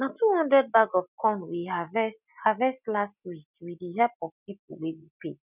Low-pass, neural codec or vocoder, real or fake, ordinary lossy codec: 3.6 kHz; none; real; none